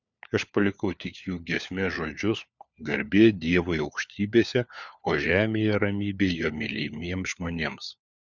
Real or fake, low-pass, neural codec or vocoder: fake; 7.2 kHz; codec, 16 kHz, 4 kbps, FunCodec, trained on LibriTTS, 50 frames a second